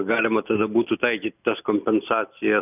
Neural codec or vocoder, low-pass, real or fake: none; 3.6 kHz; real